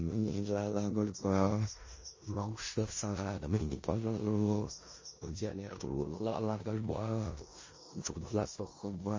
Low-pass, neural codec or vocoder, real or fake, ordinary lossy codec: 7.2 kHz; codec, 16 kHz in and 24 kHz out, 0.4 kbps, LongCat-Audio-Codec, four codebook decoder; fake; MP3, 32 kbps